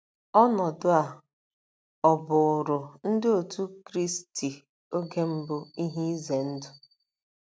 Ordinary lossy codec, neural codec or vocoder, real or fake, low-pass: none; none; real; none